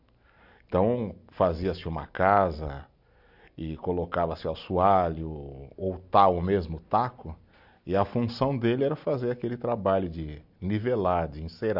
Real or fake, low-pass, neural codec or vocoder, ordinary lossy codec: real; 5.4 kHz; none; none